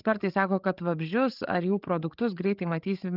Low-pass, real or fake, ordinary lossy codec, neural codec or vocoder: 5.4 kHz; fake; Opus, 32 kbps; codec, 16 kHz, 8 kbps, FreqCodec, larger model